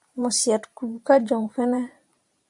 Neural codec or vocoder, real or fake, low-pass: vocoder, 44.1 kHz, 128 mel bands every 512 samples, BigVGAN v2; fake; 10.8 kHz